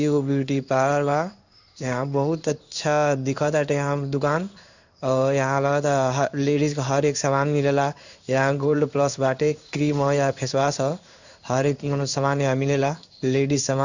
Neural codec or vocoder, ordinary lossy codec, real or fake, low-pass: codec, 16 kHz in and 24 kHz out, 1 kbps, XY-Tokenizer; none; fake; 7.2 kHz